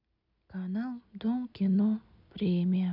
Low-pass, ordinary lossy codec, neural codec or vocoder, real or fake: 5.4 kHz; none; none; real